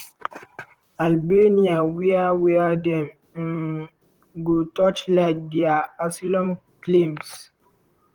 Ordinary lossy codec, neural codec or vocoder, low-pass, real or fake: Opus, 24 kbps; vocoder, 44.1 kHz, 128 mel bands, Pupu-Vocoder; 19.8 kHz; fake